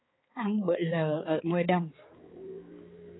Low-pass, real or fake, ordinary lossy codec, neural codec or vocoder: 7.2 kHz; fake; AAC, 16 kbps; codec, 16 kHz, 4 kbps, X-Codec, HuBERT features, trained on balanced general audio